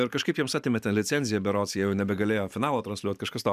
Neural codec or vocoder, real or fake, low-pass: vocoder, 44.1 kHz, 128 mel bands every 512 samples, BigVGAN v2; fake; 14.4 kHz